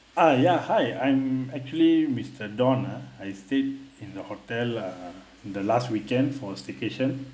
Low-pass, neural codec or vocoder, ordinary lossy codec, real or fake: none; none; none; real